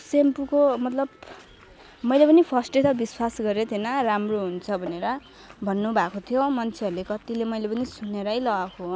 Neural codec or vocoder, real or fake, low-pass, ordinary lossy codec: none; real; none; none